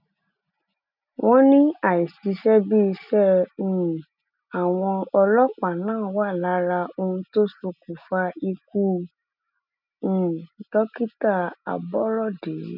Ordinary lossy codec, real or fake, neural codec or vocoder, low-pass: none; real; none; 5.4 kHz